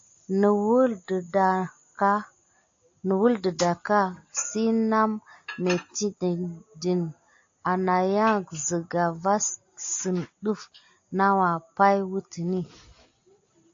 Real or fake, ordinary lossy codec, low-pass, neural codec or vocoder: real; AAC, 48 kbps; 7.2 kHz; none